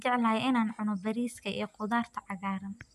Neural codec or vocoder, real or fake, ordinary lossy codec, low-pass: none; real; none; 14.4 kHz